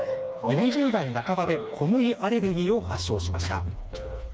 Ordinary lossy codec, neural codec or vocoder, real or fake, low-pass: none; codec, 16 kHz, 2 kbps, FreqCodec, smaller model; fake; none